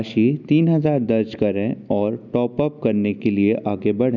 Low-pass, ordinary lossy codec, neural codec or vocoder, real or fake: 7.2 kHz; none; none; real